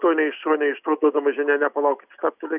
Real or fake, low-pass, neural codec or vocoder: real; 3.6 kHz; none